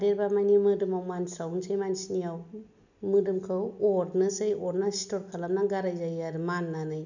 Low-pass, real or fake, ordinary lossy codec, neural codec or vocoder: 7.2 kHz; real; none; none